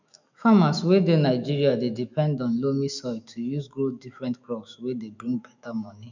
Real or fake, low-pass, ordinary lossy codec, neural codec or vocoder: fake; 7.2 kHz; none; autoencoder, 48 kHz, 128 numbers a frame, DAC-VAE, trained on Japanese speech